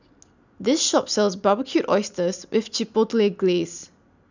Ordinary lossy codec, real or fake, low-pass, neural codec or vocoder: none; real; 7.2 kHz; none